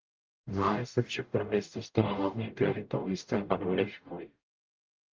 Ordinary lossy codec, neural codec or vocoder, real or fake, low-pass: Opus, 32 kbps; codec, 44.1 kHz, 0.9 kbps, DAC; fake; 7.2 kHz